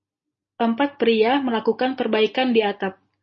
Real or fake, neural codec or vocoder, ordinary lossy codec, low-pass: real; none; MP3, 32 kbps; 7.2 kHz